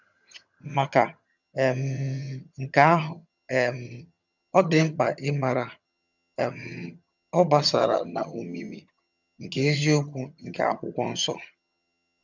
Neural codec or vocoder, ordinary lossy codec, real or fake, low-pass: vocoder, 22.05 kHz, 80 mel bands, HiFi-GAN; none; fake; 7.2 kHz